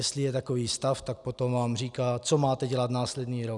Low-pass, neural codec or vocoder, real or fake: 10.8 kHz; none; real